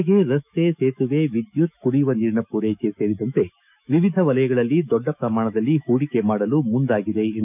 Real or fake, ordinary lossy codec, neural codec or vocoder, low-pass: fake; none; autoencoder, 48 kHz, 128 numbers a frame, DAC-VAE, trained on Japanese speech; 3.6 kHz